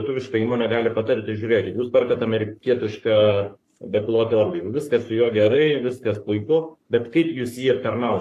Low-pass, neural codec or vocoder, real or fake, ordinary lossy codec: 14.4 kHz; codec, 44.1 kHz, 3.4 kbps, Pupu-Codec; fake; AAC, 64 kbps